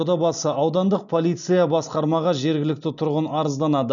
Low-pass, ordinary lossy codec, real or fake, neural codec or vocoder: 7.2 kHz; none; real; none